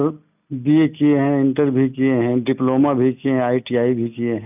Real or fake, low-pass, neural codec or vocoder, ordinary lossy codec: real; 3.6 kHz; none; none